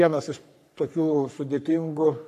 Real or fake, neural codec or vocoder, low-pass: fake; codec, 44.1 kHz, 3.4 kbps, Pupu-Codec; 14.4 kHz